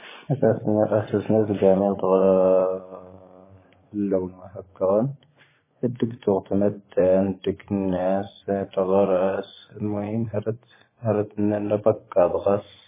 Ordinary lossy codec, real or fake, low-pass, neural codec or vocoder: MP3, 16 kbps; fake; 3.6 kHz; vocoder, 24 kHz, 100 mel bands, Vocos